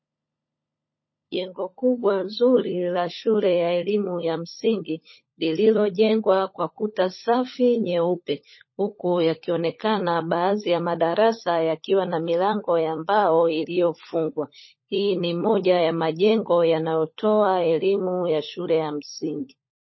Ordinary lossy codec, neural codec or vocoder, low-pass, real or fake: MP3, 24 kbps; codec, 16 kHz, 16 kbps, FunCodec, trained on LibriTTS, 50 frames a second; 7.2 kHz; fake